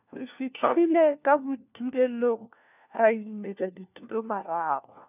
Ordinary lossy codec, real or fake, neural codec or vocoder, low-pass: none; fake; codec, 16 kHz, 1 kbps, FunCodec, trained on LibriTTS, 50 frames a second; 3.6 kHz